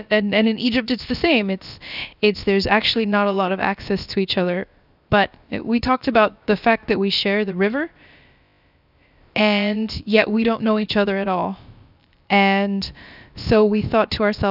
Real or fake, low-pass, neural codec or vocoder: fake; 5.4 kHz; codec, 16 kHz, about 1 kbps, DyCAST, with the encoder's durations